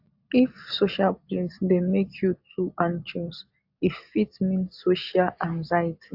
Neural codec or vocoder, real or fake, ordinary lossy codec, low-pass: none; real; AAC, 48 kbps; 5.4 kHz